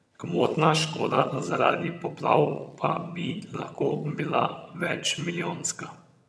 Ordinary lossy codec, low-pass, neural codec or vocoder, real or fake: none; none; vocoder, 22.05 kHz, 80 mel bands, HiFi-GAN; fake